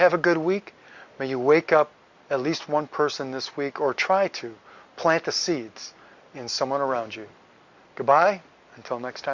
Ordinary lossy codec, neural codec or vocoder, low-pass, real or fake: Opus, 64 kbps; none; 7.2 kHz; real